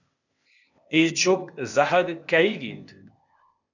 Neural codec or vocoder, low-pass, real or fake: codec, 16 kHz, 0.8 kbps, ZipCodec; 7.2 kHz; fake